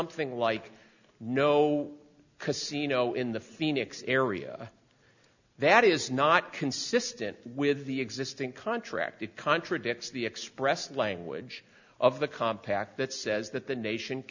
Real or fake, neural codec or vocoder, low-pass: real; none; 7.2 kHz